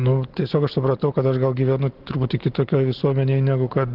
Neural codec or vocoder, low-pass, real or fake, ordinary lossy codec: none; 5.4 kHz; real; Opus, 16 kbps